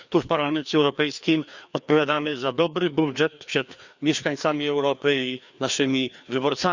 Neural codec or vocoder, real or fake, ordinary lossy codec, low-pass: codec, 16 kHz, 2 kbps, FreqCodec, larger model; fake; Opus, 64 kbps; 7.2 kHz